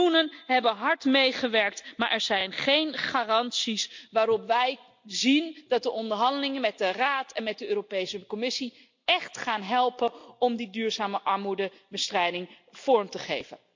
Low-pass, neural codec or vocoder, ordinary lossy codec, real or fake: 7.2 kHz; none; MP3, 64 kbps; real